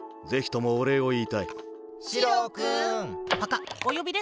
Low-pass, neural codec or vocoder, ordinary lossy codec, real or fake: none; none; none; real